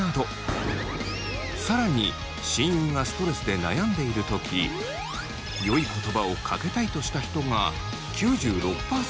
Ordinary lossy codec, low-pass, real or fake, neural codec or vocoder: none; none; real; none